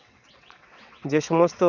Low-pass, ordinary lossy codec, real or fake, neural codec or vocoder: 7.2 kHz; none; fake; vocoder, 22.05 kHz, 80 mel bands, WaveNeXt